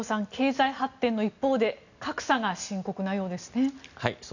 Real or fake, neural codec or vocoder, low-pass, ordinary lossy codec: real; none; 7.2 kHz; none